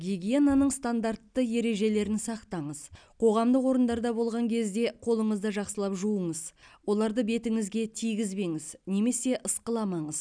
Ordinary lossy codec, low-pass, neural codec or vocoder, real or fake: none; 9.9 kHz; none; real